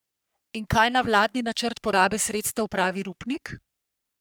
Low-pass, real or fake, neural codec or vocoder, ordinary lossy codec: none; fake; codec, 44.1 kHz, 3.4 kbps, Pupu-Codec; none